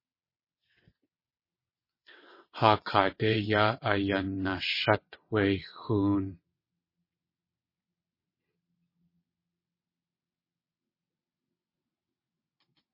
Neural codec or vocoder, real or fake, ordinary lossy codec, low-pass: vocoder, 22.05 kHz, 80 mel bands, WaveNeXt; fake; MP3, 24 kbps; 5.4 kHz